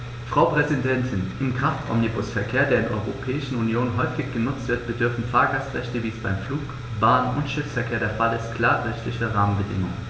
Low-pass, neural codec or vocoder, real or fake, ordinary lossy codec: none; none; real; none